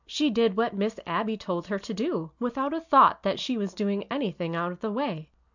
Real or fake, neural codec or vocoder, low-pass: real; none; 7.2 kHz